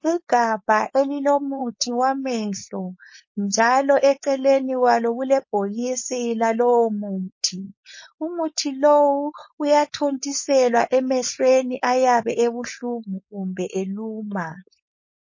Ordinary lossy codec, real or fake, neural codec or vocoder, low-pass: MP3, 32 kbps; fake; codec, 16 kHz, 4.8 kbps, FACodec; 7.2 kHz